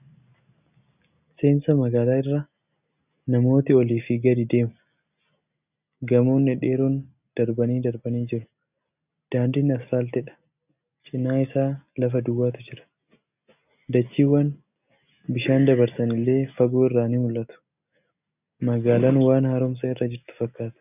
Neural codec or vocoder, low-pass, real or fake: none; 3.6 kHz; real